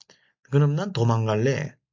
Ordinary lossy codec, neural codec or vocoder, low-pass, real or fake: MP3, 64 kbps; none; 7.2 kHz; real